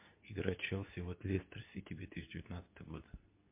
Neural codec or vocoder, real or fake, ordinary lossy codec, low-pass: codec, 24 kHz, 0.9 kbps, WavTokenizer, medium speech release version 2; fake; MP3, 24 kbps; 3.6 kHz